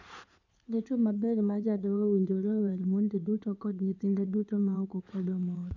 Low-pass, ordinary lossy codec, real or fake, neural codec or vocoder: 7.2 kHz; none; fake; codec, 16 kHz in and 24 kHz out, 2.2 kbps, FireRedTTS-2 codec